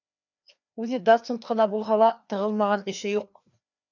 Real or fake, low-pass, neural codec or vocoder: fake; 7.2 kHz; codec, 16 kHz, 2 kbps, FreqCodec, larger model